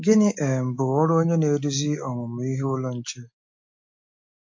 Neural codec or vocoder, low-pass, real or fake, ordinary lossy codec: none; 7.2 kHz; real; MP3, 48 kbps